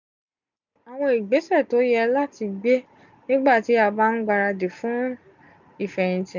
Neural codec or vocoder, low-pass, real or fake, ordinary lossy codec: none; 7.2 kHz; real; none